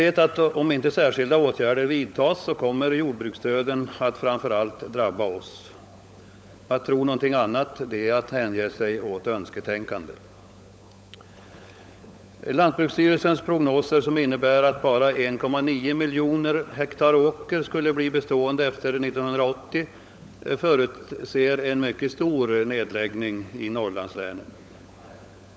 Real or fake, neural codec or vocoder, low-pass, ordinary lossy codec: fake; codec, 16 kHz, 16 kbps, FunCodec, trained on LibriTTS, 50 frames a second; none; none